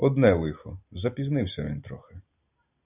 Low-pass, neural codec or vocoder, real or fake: 3.6 kHz; none; real